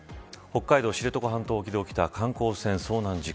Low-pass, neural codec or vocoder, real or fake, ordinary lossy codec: none; none; real; none